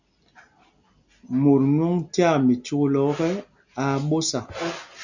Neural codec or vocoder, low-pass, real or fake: none; 7.2 kHz; real